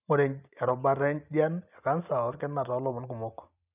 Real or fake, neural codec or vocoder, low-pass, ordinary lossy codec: real; none; 3.6 kHz; none